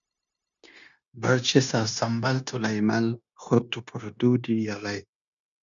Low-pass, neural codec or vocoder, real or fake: 7.2 kHz; codec, 16 kHz, 0.9 kbps, LongCat-Audio-Codec; fake